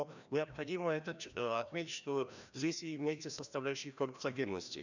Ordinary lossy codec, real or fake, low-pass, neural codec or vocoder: none; fake; 7.2 kHz; codec, 16 kHz, 1 kbps, FreqCodec, larger model